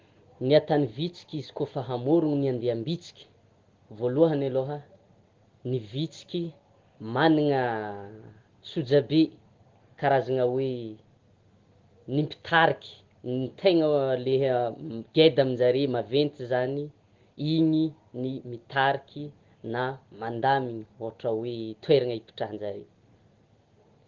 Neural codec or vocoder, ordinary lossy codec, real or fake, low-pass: none; Opus, 16 kbps; real; 7.2 kHz